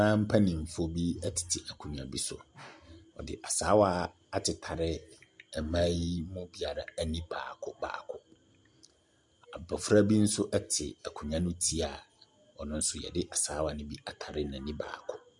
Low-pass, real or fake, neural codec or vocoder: 10.8 kHz; real; none